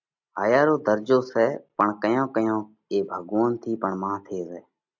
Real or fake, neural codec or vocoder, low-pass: real; none; 7.2 kHz